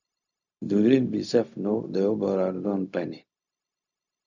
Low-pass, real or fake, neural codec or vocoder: 7.2 kHz; fake; codec, 16 kHz, 0.4 kbps, LongCat-Audio-Codec